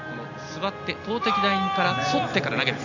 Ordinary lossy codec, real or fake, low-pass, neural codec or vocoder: none; real; 7.2 kHz; none